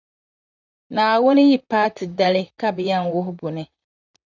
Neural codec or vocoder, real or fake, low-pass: vocoder, 44.1 kHz, 128 mel bands, Pupu-Vocoder; fake; 7.2 kHz